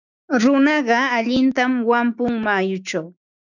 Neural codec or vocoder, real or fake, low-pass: codec, 16 kHz, 6 kbps, DAC; fake; 7.2 kHz